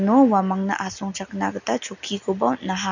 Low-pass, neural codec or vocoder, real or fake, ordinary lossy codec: 7.2 kHz; none; real; none